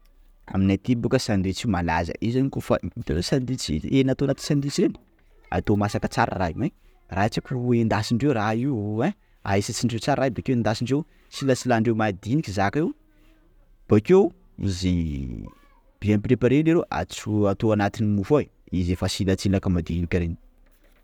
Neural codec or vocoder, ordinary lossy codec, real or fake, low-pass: none; none; real; 19.8 kHz